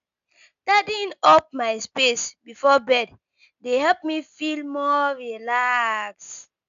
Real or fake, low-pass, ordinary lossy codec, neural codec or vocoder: real; 7.2 kHz; AAC, 96 kbps; none